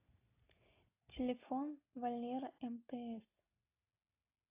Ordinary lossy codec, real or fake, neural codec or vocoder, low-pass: AAC, 24 kbps; real; none; 3.6 kHz